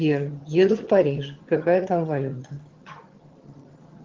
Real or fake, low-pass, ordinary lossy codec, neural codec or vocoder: fake; 7.2 kHz; Opus, 16 kbps; vocoder, 22.05 kHz, 80 mel bands, HiFi-GAN